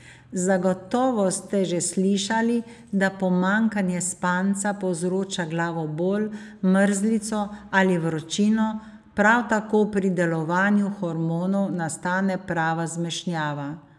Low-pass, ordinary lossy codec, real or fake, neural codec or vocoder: none; none; real; none